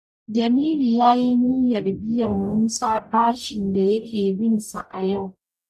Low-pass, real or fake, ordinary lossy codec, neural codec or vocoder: 14.4 kHz; fake; none; codec, 44.1 kHz, 0.9 kbps, DAC